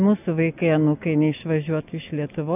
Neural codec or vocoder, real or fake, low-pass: none; real; 3.6 kHz